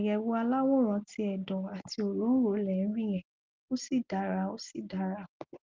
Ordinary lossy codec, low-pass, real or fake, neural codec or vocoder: Opus, 24 kbps; 7.2 kHz; real; none